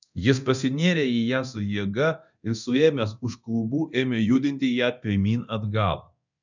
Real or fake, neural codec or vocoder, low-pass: fake; codec, 24 kHz, 0.9 kbps, DualCodec; 7.2 kHz